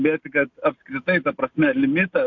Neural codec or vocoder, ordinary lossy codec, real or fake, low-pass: none; MP3, 64 kbps; real; 7.2 kHz